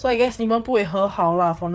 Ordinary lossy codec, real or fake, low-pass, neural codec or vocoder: none; fake; none; codec, 16 kHz, 4 kbps, FreqCodec, larger model